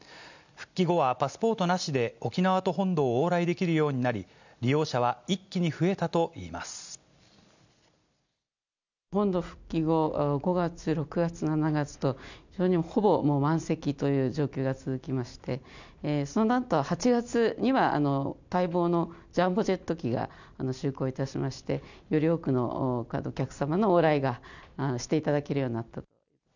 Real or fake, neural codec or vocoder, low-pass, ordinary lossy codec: real; none; 7.2 kHz; none